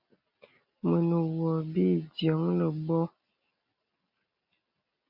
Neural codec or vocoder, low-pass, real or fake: none; 5.4 kHz; real